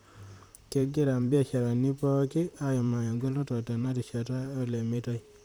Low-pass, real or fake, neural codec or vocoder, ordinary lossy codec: none; fake; vocoder, 44.1 kHz, 128 mel bands, Pupu-Vocoder; none